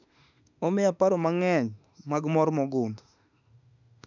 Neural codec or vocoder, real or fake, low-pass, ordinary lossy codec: autoencoder, 48 kHz, 32 numbers a frame, DAC-VAE, trained on Japanese speech; fake; 7.2 kHz; none